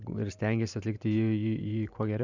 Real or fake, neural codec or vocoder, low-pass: fake; vocoder, 44.1 kHz, 128 mel bands every 512 samples, BigVGAN v2; 7.2 kHz